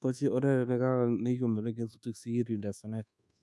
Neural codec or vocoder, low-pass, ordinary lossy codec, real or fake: codec, 24 kHz, 1.2 kbps, DualCodec; none; none; fake